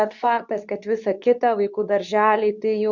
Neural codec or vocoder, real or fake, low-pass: codec, 24 kHz, 0.9 kbps, WavTokenizer, medium speech release version 2; fake; 7.2 kHz